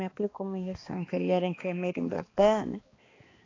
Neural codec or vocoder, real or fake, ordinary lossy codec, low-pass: codec, 16 kHz, 2 kbps, X-Codec, HuBERT features, trained on balanced general audio; fake; AAC, 32 kbps; 7.2 kHz